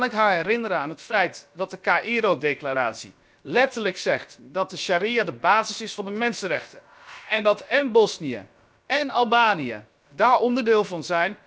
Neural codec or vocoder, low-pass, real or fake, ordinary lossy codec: codec, 16 kHz, about 1 kbps, DyCAST, with the encoder's durations; none; fake; none